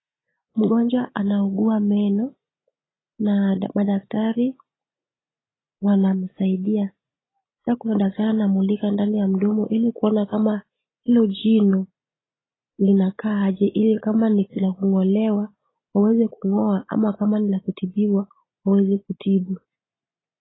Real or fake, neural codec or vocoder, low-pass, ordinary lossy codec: real; none; 7.2 kHz; AAC, 16 kbps